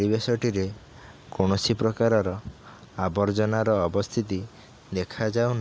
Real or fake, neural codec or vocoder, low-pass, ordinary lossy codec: real; none; none; none